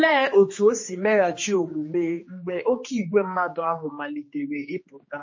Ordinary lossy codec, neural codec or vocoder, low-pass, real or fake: MP3, 32 kbps; codec, 16 kHz, 2 kbps, X-Codec, HuBERT features, trained on general audio; 7.2 kHz; fake